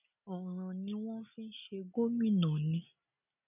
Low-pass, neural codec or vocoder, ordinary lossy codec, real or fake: 3.6 kHz; none; none; real